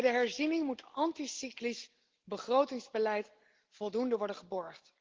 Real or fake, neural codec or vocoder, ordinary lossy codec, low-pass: real; none; Opus, 16 kbps; 7.2 kHz